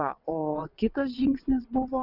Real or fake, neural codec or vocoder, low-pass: fake; vocoder, 22.05 kHz, 80 mel bands, WaveNeXt; 5.4 kHz